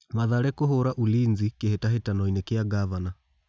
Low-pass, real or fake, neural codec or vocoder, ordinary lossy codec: none; real; none; none